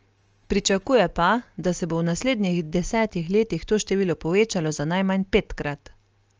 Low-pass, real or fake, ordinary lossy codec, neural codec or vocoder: 7.2 kHz; real; Opus, 24 kbps; none